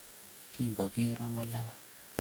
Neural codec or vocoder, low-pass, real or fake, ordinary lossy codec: codec, 44.1 kHz, 2.6 kbps, DAC; none; fake; none